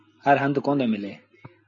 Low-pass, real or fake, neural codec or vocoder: 7.2 kHz; real; none